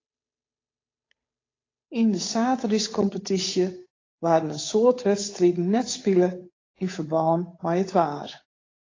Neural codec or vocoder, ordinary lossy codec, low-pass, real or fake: codec, 16 kHz, 8 kbps, FunCodec, trained on Chinese and English, 25 frames a second; AAC, 32 kbps; 7.2 kHz; fake